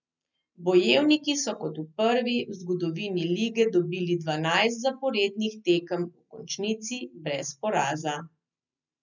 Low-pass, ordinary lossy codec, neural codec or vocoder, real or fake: 7.2 kHz; none; none; real